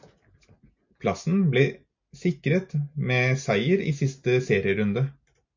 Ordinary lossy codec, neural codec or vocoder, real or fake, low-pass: MP3, 48 kbps; none; real; 7.2 kHz